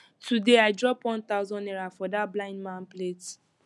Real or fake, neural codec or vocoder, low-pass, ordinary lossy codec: real; none; none; none